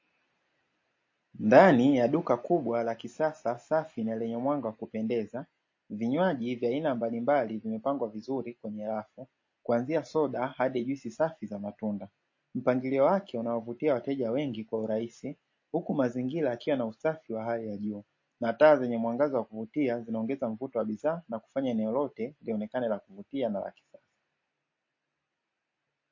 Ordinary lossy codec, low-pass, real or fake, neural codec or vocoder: MP3, 32 kbps; 7.2 kHz; real; none